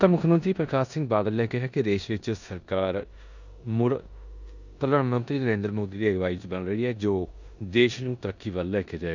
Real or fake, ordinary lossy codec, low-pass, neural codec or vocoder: fake; none; 7.2 kHz; codec, 16 kHz in and 24 kHz out, 0.9 kbps, LongCat-Audio-Codec, four codebook decoder